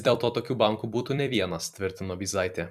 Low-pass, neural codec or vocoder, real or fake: 14.4 kHz; vocoder, 44.1 kHz, 128 mel bands every 256 samples, BigVGAN v2; fake